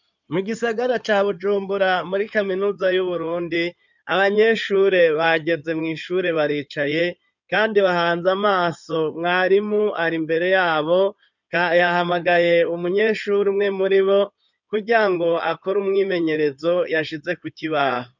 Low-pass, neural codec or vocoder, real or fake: 7.2 kHz; codec, 16 kHz in and 24 kHz out, 2.2 kbps, FireRedTTS-2 codec; fake